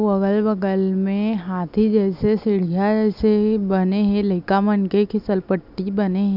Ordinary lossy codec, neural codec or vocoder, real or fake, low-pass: none; none; real; 5.4 kHz